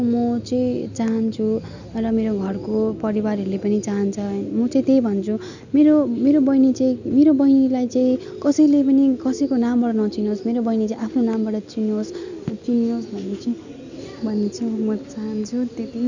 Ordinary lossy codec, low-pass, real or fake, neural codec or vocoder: none; 7.2 kHz; real; none